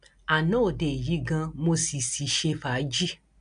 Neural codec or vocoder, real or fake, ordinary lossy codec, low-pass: none; real; none; 9.9 kHz